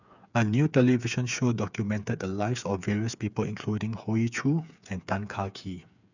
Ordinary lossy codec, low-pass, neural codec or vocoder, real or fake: none; 7.2 kHz; codec, 16 kHz, 8 kbps, FreqCodec, smaller model; fake